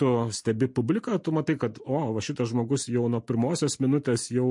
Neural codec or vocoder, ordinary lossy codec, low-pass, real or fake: none; MP3, 48 kbps; 10.8 kHz; real